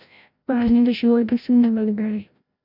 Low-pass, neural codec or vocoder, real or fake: 5.4 kHz; codec, 16 kHz, 0.5 kbps, FreqCodec, larger model; fake